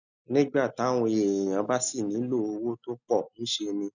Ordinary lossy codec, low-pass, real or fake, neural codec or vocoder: none; 7.2 kHz; real; none